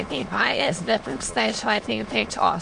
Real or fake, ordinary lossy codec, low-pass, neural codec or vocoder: fake; MP3, 64 kbps; 9.9 kHz; autoencoder, 22.05 kHz, a latent of 192 numbers a frame, VITS, trained on many speakers